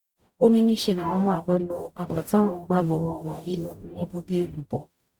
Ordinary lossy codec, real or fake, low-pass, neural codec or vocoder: Opus, 64 kbps; fake; 19.8 kHz; codec, 44.1 kHz, 0.9 kbps, DAC